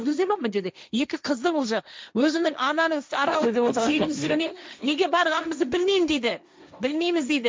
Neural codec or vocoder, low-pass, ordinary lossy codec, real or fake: codec, 16 kHz, 1.1 kbps, Voila-Tokenizer; none; none; fake